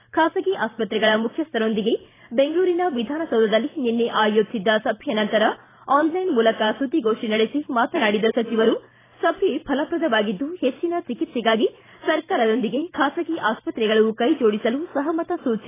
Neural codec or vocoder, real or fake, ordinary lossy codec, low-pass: vocoder, 44.1 kHz, 128 mel bands every 512 samples, BigVGAN v2; fake; AAC, 16 kbps; 3.6 kHz